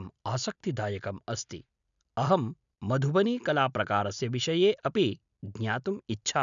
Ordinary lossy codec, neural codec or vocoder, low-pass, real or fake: none; none; 7.2 kHz; real